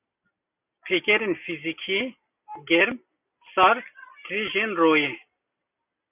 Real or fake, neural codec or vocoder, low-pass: real; none; 3.6 kHz